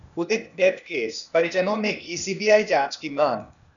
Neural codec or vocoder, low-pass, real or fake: codec, 16 kHz, 0.8 kbps, ZipCodec; 7.2 kHz; fake